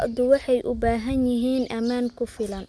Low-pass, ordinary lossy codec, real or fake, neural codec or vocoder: none; none; real; none